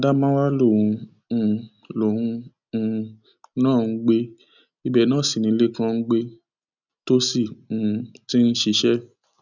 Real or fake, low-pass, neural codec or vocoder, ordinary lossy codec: real; 7.2 kHz; none; none